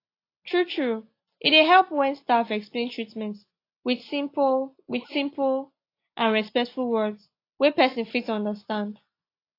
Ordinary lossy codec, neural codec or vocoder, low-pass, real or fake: AAC, 32 kbps; none; 5.4 kHz; real